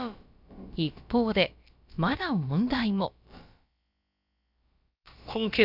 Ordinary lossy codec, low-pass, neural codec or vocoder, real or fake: none; 5.4 kHz; codec, 16 kHz, about 1 kbps, DyCAST, with the encoder's durations; fake